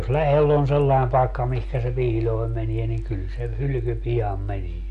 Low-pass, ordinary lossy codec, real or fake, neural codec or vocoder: 10.8 kHz; MP3, 96 kbps; real; none